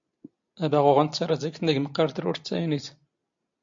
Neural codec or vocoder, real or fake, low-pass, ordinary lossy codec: none; real; 7.2 kHz; MP3, 48 kbps